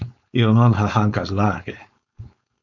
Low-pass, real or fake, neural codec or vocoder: 7.2 kHz; fake; codec, 16 kHz, 4.8 kbps, FACodec